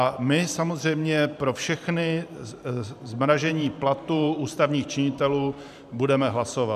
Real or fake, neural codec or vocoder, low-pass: fake; vocoder, 44.1 kHz, 128 mel bands every 512 samples, BigVGAN v2; 14.4 kHz